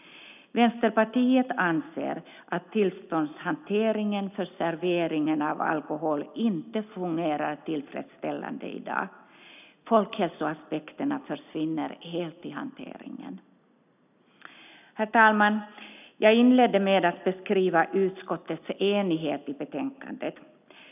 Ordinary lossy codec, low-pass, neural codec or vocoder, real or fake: none; 3.6 kHz; none; real